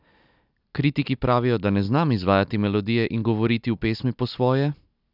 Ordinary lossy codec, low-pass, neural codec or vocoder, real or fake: none; 5.4 kHz; none; real